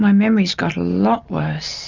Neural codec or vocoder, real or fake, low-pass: none; real; 7.2 kHz